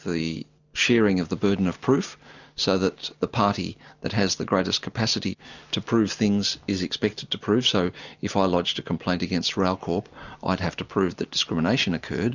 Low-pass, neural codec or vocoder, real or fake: 7.2 kHz; none; real